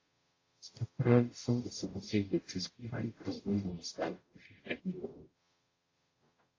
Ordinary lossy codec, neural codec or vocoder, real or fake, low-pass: AAC, 32 kbps; codec, 44.1 kHz, 0.9 kbps, DAC; fake; 7.2 kHz